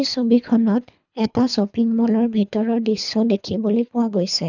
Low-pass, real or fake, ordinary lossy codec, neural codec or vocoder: 7.2 kHz; fake; none; codec, 24 kHz, 3 kbps, HILCodec